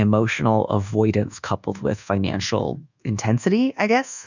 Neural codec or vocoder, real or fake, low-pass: codec, 24 kHz, 1.2 kbps, DualCodec; fake; 7.2 kHz